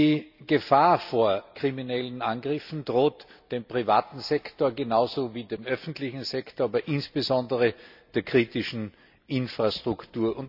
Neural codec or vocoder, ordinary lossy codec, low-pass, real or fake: none; none; 5.4 kHz; real